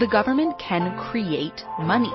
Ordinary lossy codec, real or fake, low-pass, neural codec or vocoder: MP3, 24 kbps; real; 7.2 kHz; none